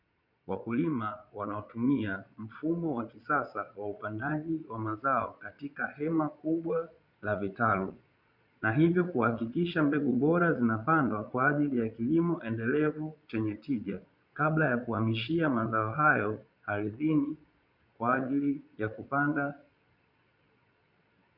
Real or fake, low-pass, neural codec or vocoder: fake; 5.4 kHz; vocoder, 22.05 kHz, 80 mel bands, Vocos